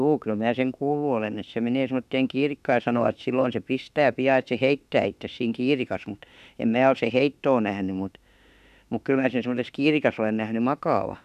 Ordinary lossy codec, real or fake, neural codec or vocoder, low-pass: none; fake; autoencoder, 48 kHz, 32 numbers a frame, DAC-VAE, trained on Japanese speech; 14.4 kHz